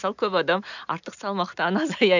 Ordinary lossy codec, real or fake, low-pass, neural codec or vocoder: none; real; 7.2 kHz; none